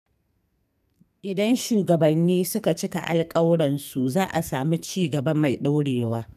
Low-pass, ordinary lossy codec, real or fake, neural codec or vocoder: 14.4 kHz; none; fake; codec, 44.1 kHz, 2.6 kbps, SNAC